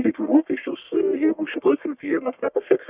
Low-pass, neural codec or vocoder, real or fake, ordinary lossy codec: 3.6 kHz; codec, 44.1 kHz, 1.7 kbps, Pupu-Codec; fake; Opus, 64 kbps